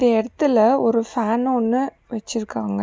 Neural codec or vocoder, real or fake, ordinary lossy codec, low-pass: none; real; none; none